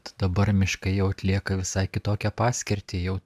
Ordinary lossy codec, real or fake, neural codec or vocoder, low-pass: AAC, 96 kbps; real; none; 14.4 kHz